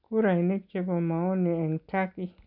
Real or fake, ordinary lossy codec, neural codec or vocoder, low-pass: real; none; none; 5.4 kHz